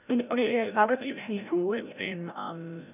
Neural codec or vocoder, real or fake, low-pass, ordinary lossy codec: codec, 16 kHz, 0.5 kbps, FreqCodec, larger model; fake; 3.6 kHz; none